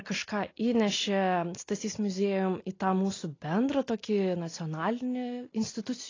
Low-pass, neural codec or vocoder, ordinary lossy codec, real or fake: 7.2 kHz; none; AAC, 32 kbps; real